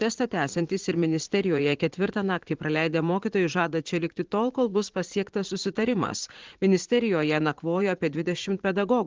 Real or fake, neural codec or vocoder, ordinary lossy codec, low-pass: fake; vocoder, 44.1 kHz, 80 mel bands, Vocos; Opus, 16 kbps; 7.2 kHz